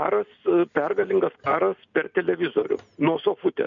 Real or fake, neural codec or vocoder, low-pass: real; none; 7.2 kHz